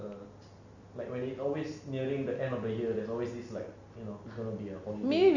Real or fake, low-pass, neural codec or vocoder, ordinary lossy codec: real; 7.2 kHz; none; none